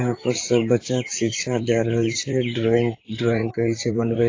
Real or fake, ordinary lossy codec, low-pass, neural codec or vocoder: fake; AAC, 32 kbps; 7.2 kHz; vocoder, 44.1 kHz, 128 mel bands, Pupu-Vocoder